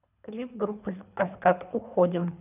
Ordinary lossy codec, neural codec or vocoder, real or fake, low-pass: none; codec, 24 kHz, 3 kbps, HILCodec; fake; 3.6 kHz